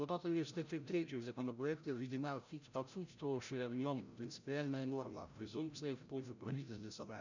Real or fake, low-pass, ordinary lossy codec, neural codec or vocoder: fake; 7.2 kHz; none; codec, 16 kHz, 0.5 kbps, FreqCodec, larger model